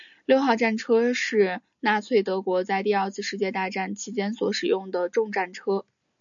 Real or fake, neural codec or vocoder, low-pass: real; none; 7.2 kHz